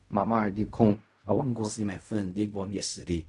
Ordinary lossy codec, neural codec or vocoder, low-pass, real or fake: none; codec, 16 kHz in and 24 kHz out, 0.4 kbps, LongCat-Audio-Codec, fine tuned four codebook decoder; 10.8 kHz; fake